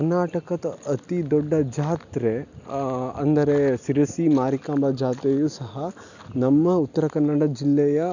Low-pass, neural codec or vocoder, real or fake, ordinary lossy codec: 7.2 kHz; none; real; none